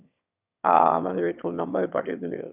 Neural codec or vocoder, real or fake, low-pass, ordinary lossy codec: autoencoder, 22.05 kHz, a latent of 192 numbers a frame, VITS, trained on one speaker; fake; 3.6 kHz; none